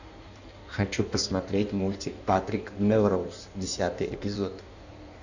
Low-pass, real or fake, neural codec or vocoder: 7.2 kHz; fake; codec, 16 kHz in and 24 kHz out, 1.1 kbps, FireRedTTS-2 codec